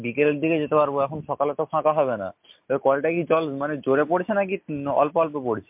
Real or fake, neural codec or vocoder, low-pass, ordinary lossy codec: real; none; 3.6 kHz; MP3, 32 kbps